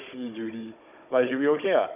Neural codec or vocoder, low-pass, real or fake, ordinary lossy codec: codec, 16 kHz, 16 kbps, FunCodec, trained on Chinese and English, 50 frames a second; 3.6 kHz; fake; none